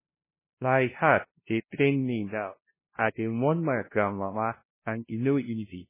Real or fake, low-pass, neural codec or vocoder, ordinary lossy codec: fake; 3.6 kHz; codec, 16 kHz, 0.5 kbps, FunCodec, trained on LibriTTS, 25 frames a second; MP3, 16 kbps